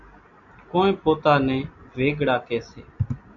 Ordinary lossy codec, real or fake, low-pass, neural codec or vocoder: AAC, 64 kbps; real; 7.2 kHz; none